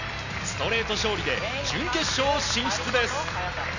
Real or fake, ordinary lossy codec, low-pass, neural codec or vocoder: real; none; 7.2 kHz; none